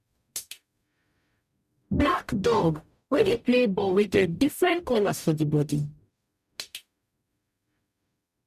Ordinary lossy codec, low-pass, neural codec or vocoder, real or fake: none; 14.4 kHz; codec, 44.1 kHz, 0.9 kbps, DAC; fake